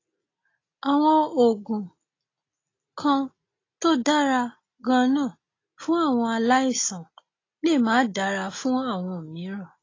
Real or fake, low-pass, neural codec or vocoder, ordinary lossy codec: real; 7.2 kHz; none; AAC, 32 kbps